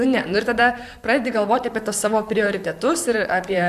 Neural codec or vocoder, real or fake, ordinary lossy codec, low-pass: vocoder, 44.1 kHz, 128 mel bands, Pupu-Vocoder; fake; AAC, 96 kbps; 14.4 kHz